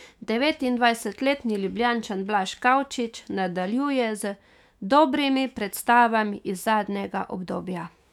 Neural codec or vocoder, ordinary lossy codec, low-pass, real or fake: autoencoder, 48 kHz, 128 numbers a frame, DAC-VAE, trained on Japanese speech; none; 19.8 kHz; fake